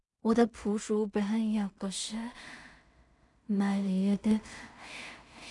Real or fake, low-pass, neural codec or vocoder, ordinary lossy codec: fake; 10.8 kHz; codec, 16 kHz in and 24 kHz out, 0.4 kbps, LongCat-Audio-Codec, two codebook decoder; none